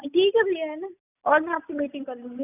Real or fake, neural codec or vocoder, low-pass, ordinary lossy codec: real; none; 3.6 kHz; none